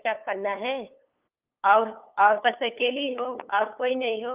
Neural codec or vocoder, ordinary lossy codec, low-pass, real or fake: codec, 16 kHz, 8 kbps, FunCodec, trained on LibriTTS, 25 frames a second; Opus, 24 kbps; 3.6 kHz; fake